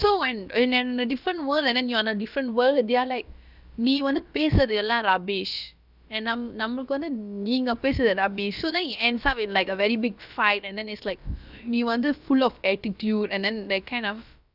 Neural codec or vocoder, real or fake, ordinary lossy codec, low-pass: codec, 16 kHz, about 1 kbps, DyCAST, with the encoder's durations; fake; none; 5.4 kHz